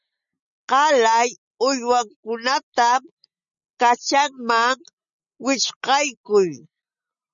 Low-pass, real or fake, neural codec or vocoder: 7.2 kHz; real; none